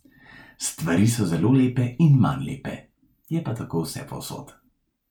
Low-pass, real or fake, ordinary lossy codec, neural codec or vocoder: 19.8 kHz; real; none; none